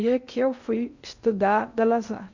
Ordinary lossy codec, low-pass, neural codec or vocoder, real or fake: none; 7.2 kHz; codec, 16 kHz in and 24 kHz out, 0.6 kbps, FocalCodec, streaming, 2048 codes; fake